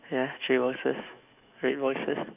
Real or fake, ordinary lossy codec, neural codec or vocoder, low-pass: fake; none; autoencoder, 48 kHz, 128 numbers a frame, DAC-VAE, trained on Japanese speech; 3.6 kHz